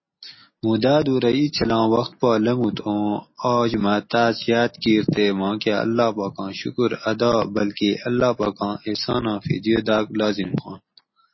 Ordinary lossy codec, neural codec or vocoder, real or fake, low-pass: MP3, 24 kbps; none; real; 7.2 kHz